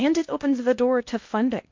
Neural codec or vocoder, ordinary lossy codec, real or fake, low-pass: codec, 16 kHz in and 24 kHz out, 0.8 kbps, FocalCodec, streaming, 65536 codes; MP3, 48 kbps; fake; 7.2 kHz